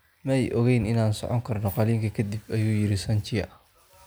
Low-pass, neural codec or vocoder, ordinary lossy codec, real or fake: none; none; none; real